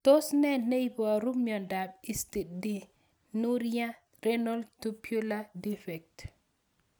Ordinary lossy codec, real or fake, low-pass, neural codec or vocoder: none; real; none; none